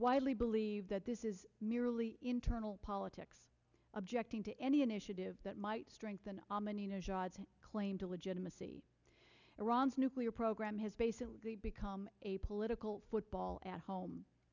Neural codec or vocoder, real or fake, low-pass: none; real; 7.2 kHz